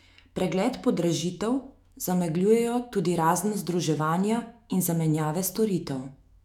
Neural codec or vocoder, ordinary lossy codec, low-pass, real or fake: autoencoder, 48 kHz, 128 numbers a frame, DAC-VAE, trained on Japanese speech; none; 19.8 kHz; fake